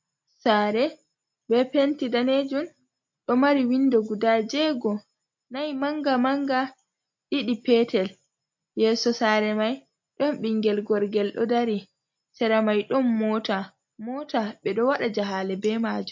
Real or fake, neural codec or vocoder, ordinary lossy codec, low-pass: real; none; MP3, 48 kbps; 7.2 kHz